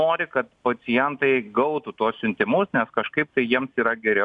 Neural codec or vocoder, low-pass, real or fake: none; 10.8 kHz; real